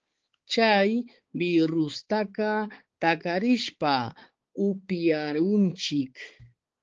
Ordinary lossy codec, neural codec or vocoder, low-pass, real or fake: Opus, 16 kbps; codec, 16 kHz, 4 kbps, X-Codec, HuBERT features, trained on balanced general audio; 7.2 kHz; fake